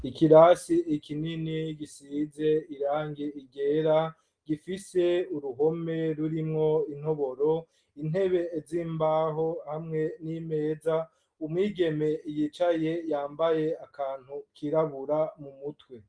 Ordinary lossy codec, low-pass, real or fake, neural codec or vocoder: Opus, 24 kbps; 9.9 kHz; real; none